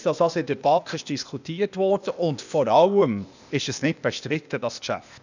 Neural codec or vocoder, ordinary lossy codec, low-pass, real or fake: codec, 16 kHz, 0.8 kbps, ZipCodec; none; 7.2 kHz; fake